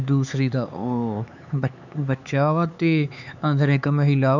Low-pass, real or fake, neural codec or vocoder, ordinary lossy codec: 7.2 kHz; fake; codec, 16 kHz, 4 kbps, X-Codec, HuBERT features, trained on LibriSpeech; none